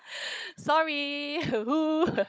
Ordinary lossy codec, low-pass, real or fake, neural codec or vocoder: none; none; fake; codec, 16 kHz, 16 kbps, FunCodec, trained on Chinese and English, 50 frames a second